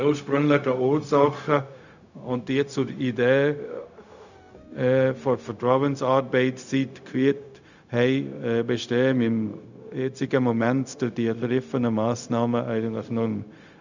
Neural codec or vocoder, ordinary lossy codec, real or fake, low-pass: codec, 16 kHz, 0.4 kbps, LongCat-Audio-Codec; none; fake; 7.2 kHz